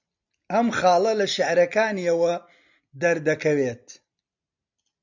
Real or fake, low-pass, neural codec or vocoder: real; 7.2 kHz; none